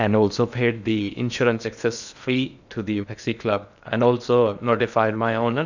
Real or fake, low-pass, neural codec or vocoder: fake; 7.2 kHz; codec, 16 kHz in and 24 kHz out, 0.8 kbps, FocalCodec, streaming, 65536 codes